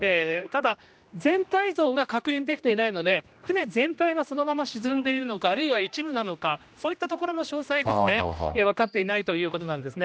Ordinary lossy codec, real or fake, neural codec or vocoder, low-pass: none; fake; codec, 16 kHz, 1 kbps, X-Codec, HuBERT features, trained on general audio; none